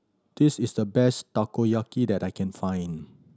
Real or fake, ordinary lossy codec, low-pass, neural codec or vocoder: real; none; none; none